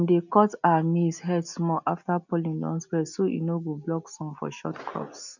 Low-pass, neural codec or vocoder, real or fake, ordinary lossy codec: 7.2 kHz; none; real; none